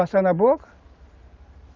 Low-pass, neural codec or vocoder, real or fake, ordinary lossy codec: 7.2 kHz; none; real; Opus, 32 kbps